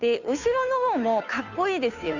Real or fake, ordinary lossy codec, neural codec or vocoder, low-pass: fake; none; codec, 16 kHz, 2 kbps, FunCodec, trained on Chinese and English, 25 frames a second; 7.2 kHz